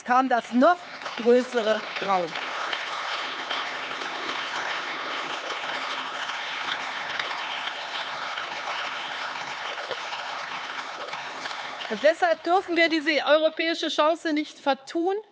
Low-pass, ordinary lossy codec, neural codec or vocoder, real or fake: none; none; codec, 16 kHz, 4 kbps, X-Codec, HuBERT features, trained on LibriSpeech; fake